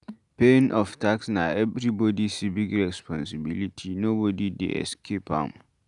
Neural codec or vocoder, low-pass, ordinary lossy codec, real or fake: none; 10.8 kHz; none; real